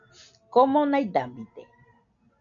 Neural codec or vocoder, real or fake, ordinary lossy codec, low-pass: none; real; MP3, 96 kbps; 7.2 kHz